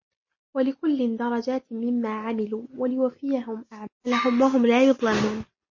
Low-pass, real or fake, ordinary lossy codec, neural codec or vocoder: 7.2 kHz; real; MP3, 32 kbps; none